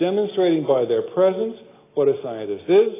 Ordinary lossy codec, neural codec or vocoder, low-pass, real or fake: AAC, 16 kbps; none; 3.6 kHz; real